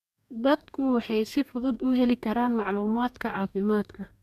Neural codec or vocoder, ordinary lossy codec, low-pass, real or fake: codec, 44.1 kHz, 2.6 kbps, DAC; none; 14.4 kHz; fake